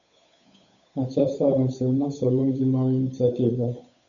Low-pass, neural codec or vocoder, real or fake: 7.2 kHz; codec, 16 kHz, 8 kbps, FunCodec, trained on Chinese and English, 25 frames a second; fake